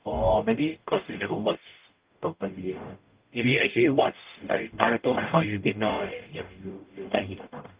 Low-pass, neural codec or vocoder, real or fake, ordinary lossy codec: 3.6 kHz; codec, 44.1 kHz, 0.9 kbps, DAC; fake; Opus, 24 kbps